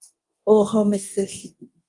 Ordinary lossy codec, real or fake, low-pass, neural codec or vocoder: Opus, 24 kbps; fake; 10.8 kHz; codec, 24 kHz, 0.9 kbps, DualCodec